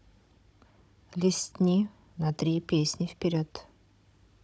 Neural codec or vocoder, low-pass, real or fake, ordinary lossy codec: codec, 16 kHz, 16 kbps, FunCodec, trained on Chinese and English, 50 frames a second; none; fake; none